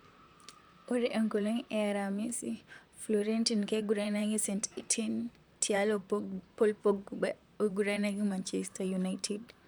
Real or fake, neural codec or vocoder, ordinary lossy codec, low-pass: fake; vocoder, 44.1 kHz, 128 mel bands, Pupu-Vocoder; none; none